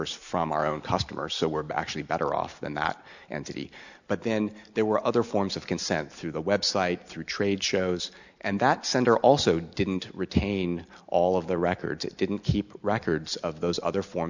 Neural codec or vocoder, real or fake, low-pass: none; real; 7.2 kHz